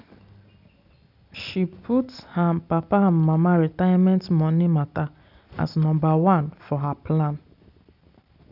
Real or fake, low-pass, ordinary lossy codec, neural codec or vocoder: real; 5.4 kHz; none; none